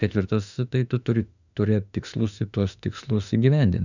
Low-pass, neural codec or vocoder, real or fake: 7.2 kHz; autoencoder, 48 kHz, 32 numbers a frame, DAC-VAE, trained on Japanese speech; fake